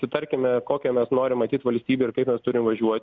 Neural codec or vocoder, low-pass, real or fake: none; 7.2 kHz; real